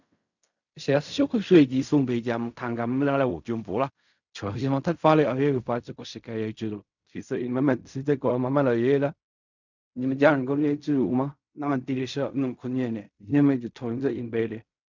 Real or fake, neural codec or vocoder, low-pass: fake; codec, 16 kHz in and 24 kHz out, 0.4 kbps, LongCat-Audio-Codec, fine tuned four codebook decoder; 7.2 kHz